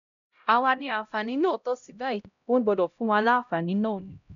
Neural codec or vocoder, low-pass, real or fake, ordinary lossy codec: codec, 16 kHz, 0.5 kbps, X-Codec, HuBERT features, trained on LibriSpeech; 7.2 kHz; fake; none